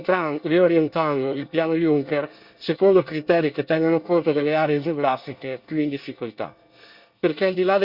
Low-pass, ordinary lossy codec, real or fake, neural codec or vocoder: 5.4 kHz; Opus, 64 kbps; fake; codec, 24 kHz, 1 kbps, SNAC